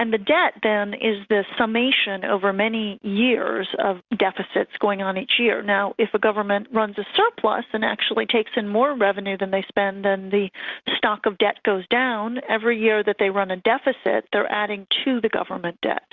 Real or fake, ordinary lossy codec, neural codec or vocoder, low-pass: real; Opus, 64 kbps; none; 7.2 kHz